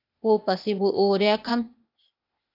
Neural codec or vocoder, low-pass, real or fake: codec, 16 kHz, 0.8 kbps, ZipCodec; 5.4 kHz; fake